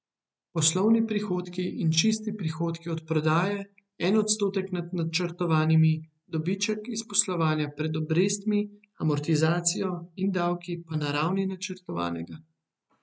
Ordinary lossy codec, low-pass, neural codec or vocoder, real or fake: none; none; none; real